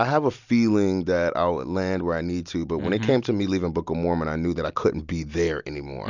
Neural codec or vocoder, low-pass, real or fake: none; 7.2 kHz; real